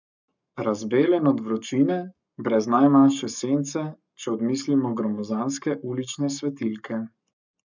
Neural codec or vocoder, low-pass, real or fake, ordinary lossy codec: codec, 44.1 kHz, 7.8 kbps, Pupu-Codec; 7.2 kHz; fake; none